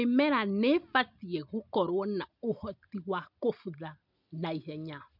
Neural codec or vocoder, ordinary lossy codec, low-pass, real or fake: none; none; 5.4 kHz; real